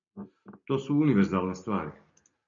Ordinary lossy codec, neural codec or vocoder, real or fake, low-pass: MP3, 48 kbps; none; real; 7.2 kHz